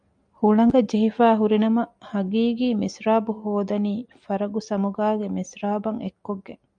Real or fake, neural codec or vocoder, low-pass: real; none; 9.9 kHz